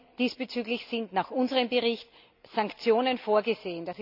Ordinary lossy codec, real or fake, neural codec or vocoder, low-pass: none; real; none; 5.4 kHz